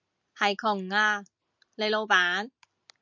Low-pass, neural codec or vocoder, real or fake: 7.2 kHz; none; real